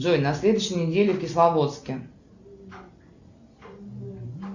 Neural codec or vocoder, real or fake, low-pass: none; real; 7.2 kHz